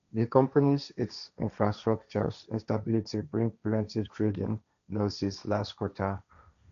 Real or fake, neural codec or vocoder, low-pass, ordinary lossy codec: fake; codec, 16 kHz, 1.1 kbps, Voila-Tokenizer; 7.2 kHz; none